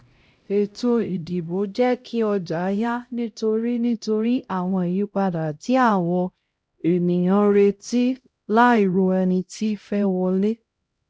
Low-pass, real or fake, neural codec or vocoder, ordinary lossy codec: none; fake; codec, 16 kHz, 0.5 kbps, X-Codec, HuBERT features, trained on LibriSpeech; none